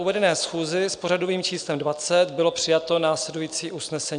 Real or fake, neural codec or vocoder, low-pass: real; none; 9.9 kHz